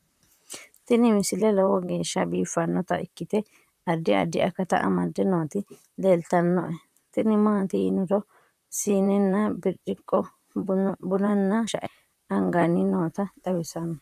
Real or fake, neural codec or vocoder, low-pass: fake; vocoder, 44.1 kHz, 128 mel bands, Pupu-Vocoder; 14.4 kHz